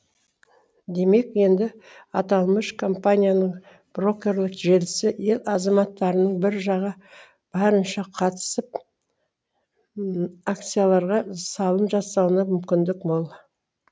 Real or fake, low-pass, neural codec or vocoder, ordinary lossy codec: real; none; none; none